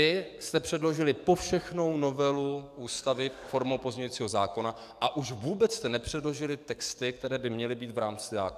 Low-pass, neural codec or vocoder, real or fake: 14.4 kHz; codec, 44.1 kHz, 7.8 kbps, DAC; fake